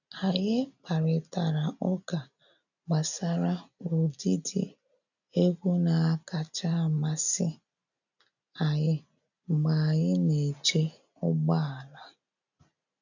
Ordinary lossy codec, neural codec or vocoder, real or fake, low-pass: none; none; real; 7.2 kHz